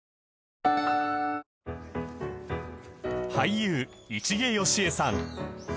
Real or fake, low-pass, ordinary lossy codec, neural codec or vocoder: real; none; none; none